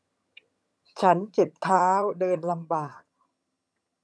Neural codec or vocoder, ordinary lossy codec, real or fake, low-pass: vocoder, 22.05 kHz, 80 mel bands, HiFi-GAN; none; fake; none